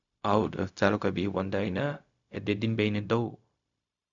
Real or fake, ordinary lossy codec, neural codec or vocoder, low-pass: fake; none; codec, 16 kHz, 0.4 kbps, LongCat-Audio-Codec; 7.2 kHz